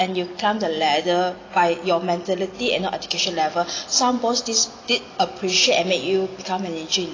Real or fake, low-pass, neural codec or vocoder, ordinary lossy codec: real; 7.2 kHz; none; AAC, 32 kbps